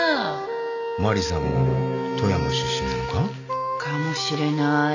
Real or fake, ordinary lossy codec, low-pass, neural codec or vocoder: real; none; 7.2 kHz; none